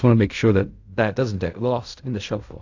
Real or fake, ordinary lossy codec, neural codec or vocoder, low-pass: fake; AAC, 48 kbps; codec, 16 kHz in and 24 kHz out, 0.4 kbps, LongCat-Audio-Codec, fine tuned four codebook decoder; 7.2 kHz